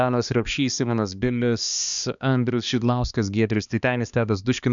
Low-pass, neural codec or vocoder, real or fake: 7.2 kHz; codec, 16 kHz, 2 kbps, X-Codec, HuBERT features, trained on balanced general audio; fake